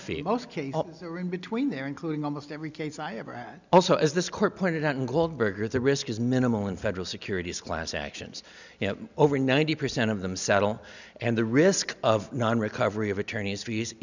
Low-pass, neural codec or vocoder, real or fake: 7.2 kHz; none; real